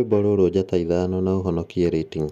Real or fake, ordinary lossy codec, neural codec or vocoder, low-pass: real; none; none; 14.4 kHz